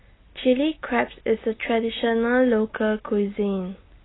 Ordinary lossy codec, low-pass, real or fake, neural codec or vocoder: AAC, 16 kbps; 7.2 kHz; real; none